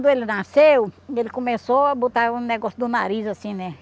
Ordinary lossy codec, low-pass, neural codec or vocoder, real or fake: none; none; none; real